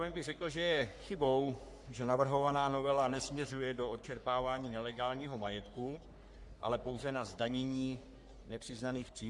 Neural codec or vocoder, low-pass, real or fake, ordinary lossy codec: codec, 44.1 kHz, 3.4 kbps, Pupu-Codec; 10.8 kHz; fake; Opus, 64 kbps